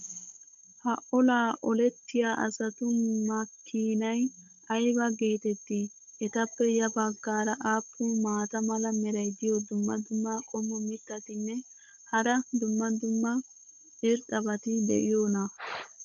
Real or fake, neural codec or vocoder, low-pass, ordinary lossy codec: fake; codec, 16 kHz, 16 kbps, FunCodec, trained on Chinese and English, 50 frames a second; 7.2 kHz; MP3, 64 kbps